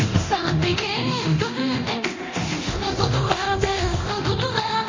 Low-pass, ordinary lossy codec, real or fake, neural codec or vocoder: 7.2 kHz; MP3, 32 kbps; fake; codec, 24 kHz, 0.9 kbps, DualCodec